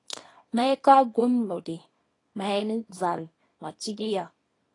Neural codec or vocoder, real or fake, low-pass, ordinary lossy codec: codec, 24 kHz, 0.9 kbps, WavTokenizer, small release; fake; 10.8 kHz; AAC, 32 kbps